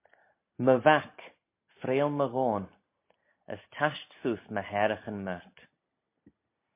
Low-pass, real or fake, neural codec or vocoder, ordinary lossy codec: 3.6 kHz; real; none; MP3, 24 kbps